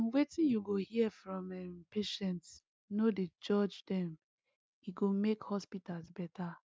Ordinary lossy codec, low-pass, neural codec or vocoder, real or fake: none; none; none; real